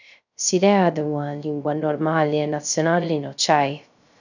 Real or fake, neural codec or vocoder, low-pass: fake; codec, 16 kHz, 0.3 kbps, FocalCodec; 7.2 kHz